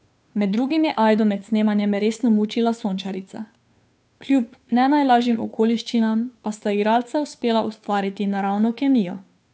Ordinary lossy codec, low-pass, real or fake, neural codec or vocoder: none; none; fake; codec, 16 kHz, 2 kbps, FunCodec, trained on Chinese and English, 25 frames a second